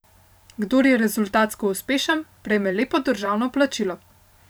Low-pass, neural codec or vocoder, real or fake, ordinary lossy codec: none; vocoder, 44.1 kHz, 128 mel bands every 512 samples, BigVGAN v2; fake; none